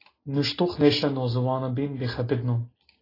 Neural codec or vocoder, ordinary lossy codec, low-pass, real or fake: none; AAC, 24 kbps; 5.4 kHz; real